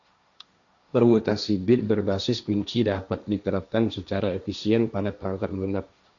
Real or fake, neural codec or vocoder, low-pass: fake; codec, 16 kHz, 1.1 kbps, Voila-Tokenizer; 7.2 kHz